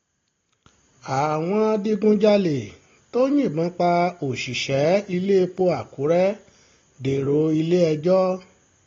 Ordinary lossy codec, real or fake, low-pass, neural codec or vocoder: AAC, 32 kbps; real; 7.2 kHz; none